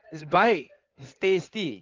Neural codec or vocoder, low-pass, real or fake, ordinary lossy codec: codec, 16 kHz in and 24 kHz out, 2.2 kbps, FireRedTTS-2 codec; 7.2 kHz; fake; Opus, 24 kbps